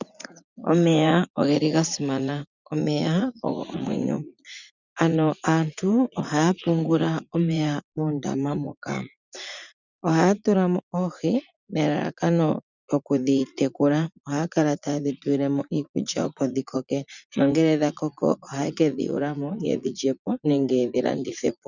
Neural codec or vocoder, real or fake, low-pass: vocoder, 44.1 kHz, 80 mel bands, Vocos; fake; 7.2 kHz